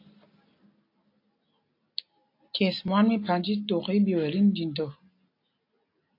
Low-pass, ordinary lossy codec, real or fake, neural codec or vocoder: 5.4 kHz; AAC, 32 kbps; real; none